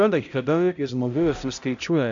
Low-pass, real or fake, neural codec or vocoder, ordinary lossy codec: 7.2 kHz; fake; codec, 16 kHz, 0.5 kbps, X-Codec, HuBERT features, trained on balanced general audio; AAC, 64 kbps